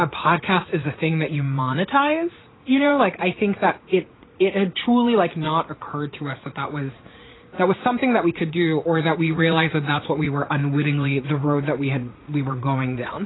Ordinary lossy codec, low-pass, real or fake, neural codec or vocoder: AAC, 16 kbps; 7.2 kHz; fake; vocoder, 44.1 kHz, 80 mel bands, Vocos